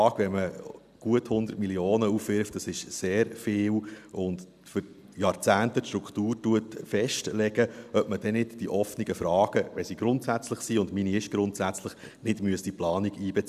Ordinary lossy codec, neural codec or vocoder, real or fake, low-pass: none; none; real; 14.4 kHz